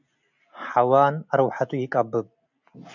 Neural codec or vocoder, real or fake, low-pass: none; real; 7.2 kHz